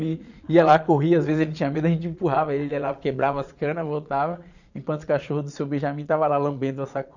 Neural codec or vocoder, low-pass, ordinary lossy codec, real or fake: vocoder, 22.05 kHz, 80 mel bands, Vocos; 7.2 kHz; none; fake